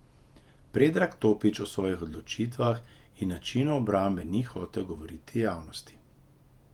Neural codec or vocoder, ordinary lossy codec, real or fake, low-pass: vocoder, 48 kHz, 128 mel bands, Vocos; Opus, 24 kbps; fake; 19.8 kHz